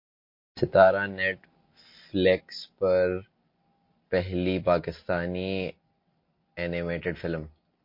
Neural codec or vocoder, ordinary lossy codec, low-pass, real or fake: none; MP3, 32 kbps; 5.4 kHz; real